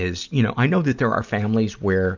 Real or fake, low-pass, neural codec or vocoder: real; 7.2 kHz; none